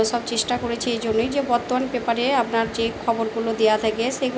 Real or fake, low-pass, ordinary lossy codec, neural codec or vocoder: real; none; none; none